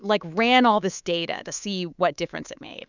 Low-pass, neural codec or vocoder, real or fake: 7.2 kHz; codec, 24 kHz, 3.1 kbps, DualCodec; fake